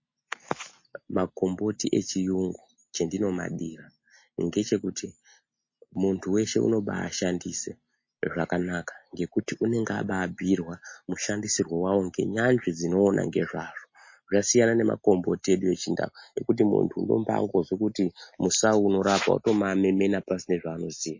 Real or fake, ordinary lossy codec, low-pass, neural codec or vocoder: real; MP3, 32 kbps; 7.2 kHz; none